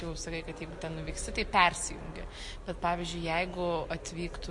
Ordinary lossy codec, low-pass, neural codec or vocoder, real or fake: MP3, 48 kbps; 10.8 kHz; none; real